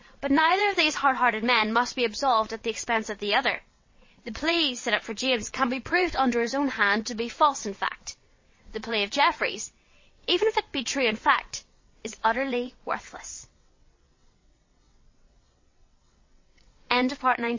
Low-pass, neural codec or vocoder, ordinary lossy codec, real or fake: 7.2 kHz; vocoder, 22.05 kHz, 80 mel bands, WaveNeXt; MP3, 32 kbps; fake